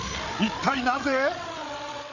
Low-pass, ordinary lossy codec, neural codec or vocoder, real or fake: 7.2 kHz; none; codec, 16 kHz, 8 kbps, FreqCodec, larger model; fake